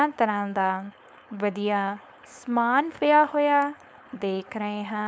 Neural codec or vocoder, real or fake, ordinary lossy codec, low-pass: codec, 16 kHz, 4.8 kbps, FACodec; fake; none; none